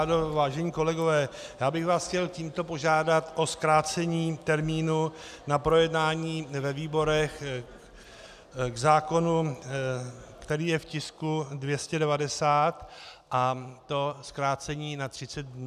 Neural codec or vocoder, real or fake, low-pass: none; real; 14.4 kHz